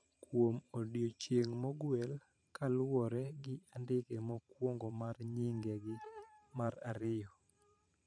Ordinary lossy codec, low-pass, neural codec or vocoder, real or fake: none; 9.9 kHz; vocoder, 44.1 kHz, 128 mel bands every 512 samples, BigVGAN v2; fake